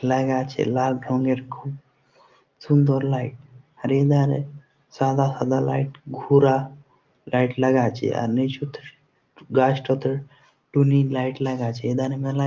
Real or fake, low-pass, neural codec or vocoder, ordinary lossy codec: real; 7.2 kHz; none; Opus, 32 kbps